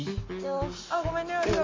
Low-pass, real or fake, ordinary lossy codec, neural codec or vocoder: 7.2 kHz; real; MP3, 32 kbps; none